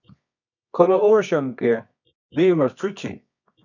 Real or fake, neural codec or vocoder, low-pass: fake; codec, 24 kHz, 0.9 kbps, WavTokenizer, medium music audio release; 7.2 kHz